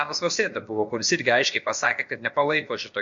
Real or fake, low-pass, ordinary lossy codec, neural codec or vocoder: fake; 7.2 kHz; MP3, 48 kbps; codec, 16 kHz, about 1 kbps, DyCAST, with the encoder's durations